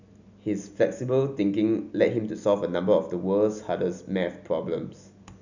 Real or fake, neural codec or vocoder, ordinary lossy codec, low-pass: real; none; none; 7.2 kHz